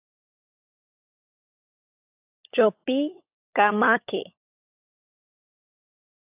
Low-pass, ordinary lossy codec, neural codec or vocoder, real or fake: 3.6 kHz; AAC, 32 kbps; codec, 16 kHz, 16 kbps, FunCodec, trained on LibriTTS, 50 frames a second; fake